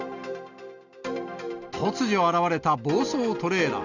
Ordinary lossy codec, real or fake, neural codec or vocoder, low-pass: none; real; none; 7.2 kHz